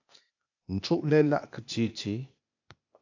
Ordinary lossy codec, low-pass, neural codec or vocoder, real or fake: AAC, 48 kbps; 7.2 kHz; codec, 16 kHz, 0.8 kbps, ZipCodec; fake